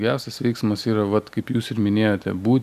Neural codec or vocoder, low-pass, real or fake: none; 14.4 kHz; real